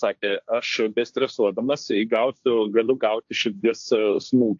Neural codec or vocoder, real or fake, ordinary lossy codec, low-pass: codec, 16 kHz, 1.1 kbps, Voila-Tokenizer; fake; AAC, 64 kbps; 7.2 kHz